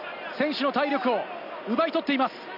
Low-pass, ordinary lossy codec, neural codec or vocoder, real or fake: 5.4 kHz; none; none; real